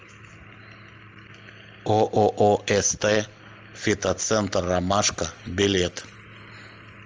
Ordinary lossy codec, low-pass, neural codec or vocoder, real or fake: Opus, 24 kbps; 7.2 kHz; none; real